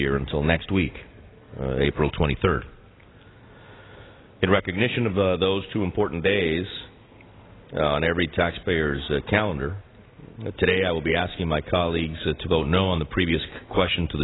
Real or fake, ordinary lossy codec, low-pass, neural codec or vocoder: real; AAC, 16 kbps; 7.2 kHz; none